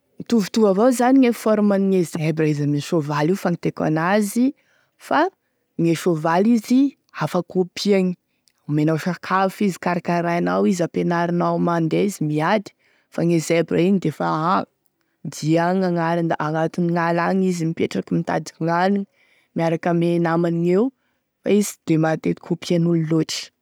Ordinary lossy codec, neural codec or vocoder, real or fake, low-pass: none; none; real; none